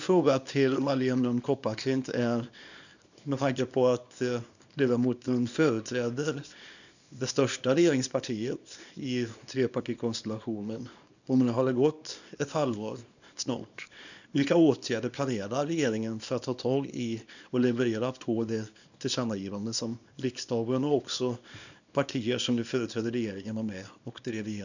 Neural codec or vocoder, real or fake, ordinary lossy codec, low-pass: codec, 24 kHz, 0.9 kbps, WavTokenizer, small release; fake; none; 7.2 kHz